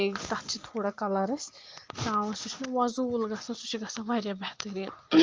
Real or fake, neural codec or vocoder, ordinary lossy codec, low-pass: real; none; Opus, 24 kbps; 7.2 kHz